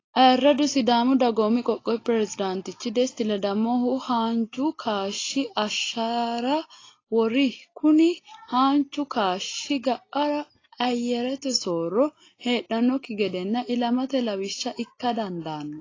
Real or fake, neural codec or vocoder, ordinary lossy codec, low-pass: real; none; AAC, 32 kbps; 7.2 kHz